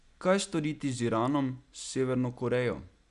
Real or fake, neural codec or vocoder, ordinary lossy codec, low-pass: real; none; none; 10.8 kHz